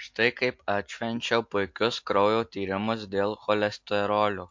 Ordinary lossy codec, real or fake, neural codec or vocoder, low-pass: MP3, 48 kbps; real; none; 7.2 kHz